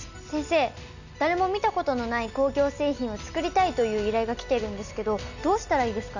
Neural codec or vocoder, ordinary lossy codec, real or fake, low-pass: none; none; real; 7.2 kHz